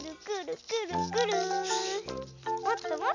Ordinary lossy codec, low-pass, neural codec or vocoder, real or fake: none; 7.2 kHz; none; real